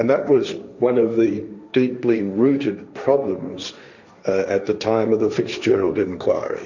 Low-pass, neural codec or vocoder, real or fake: 7.2 kHz; codec, 16 kHz, 1.1 kbps, Voila-Tokenizer; fake